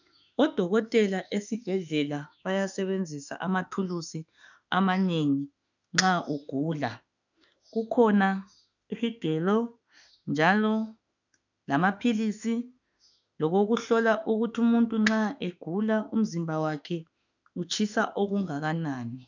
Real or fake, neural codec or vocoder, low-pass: fake; autoencoder, 48 kHz, 32 numbers a frame, DAC-VAE, trained on Japanese speech; 7.2 kHz